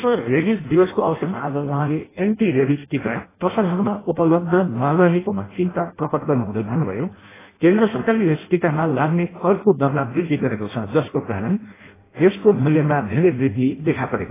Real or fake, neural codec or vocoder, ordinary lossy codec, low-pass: fake; codec, 16 kHz in and 24 kHz out, 0.6 kbps, FireRedTTS-2 codec; AAC, 16 kbps; 3.6 kHz